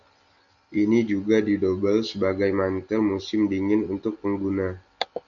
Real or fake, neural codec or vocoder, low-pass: real; none; 7.2 kHz